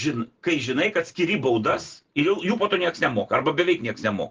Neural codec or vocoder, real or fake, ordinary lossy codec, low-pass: none; real; Opus, 16 kbps; 7.2 kHz